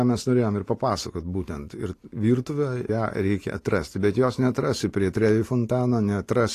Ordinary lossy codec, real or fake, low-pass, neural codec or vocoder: AAC, 48 kbps; real; 14.4 kHz; none